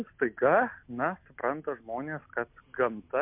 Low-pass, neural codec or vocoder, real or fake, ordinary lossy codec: 3.6 kHz; none; real; MP3, 32 kbps